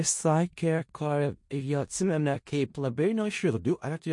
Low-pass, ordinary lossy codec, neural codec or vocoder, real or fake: 10.8 kHz; MP3, 48 kbps; codec, 16 kHz in and 24 kHz out, 0.4 kbps, LongCat-Audio-Codec, four codebook decoder; fake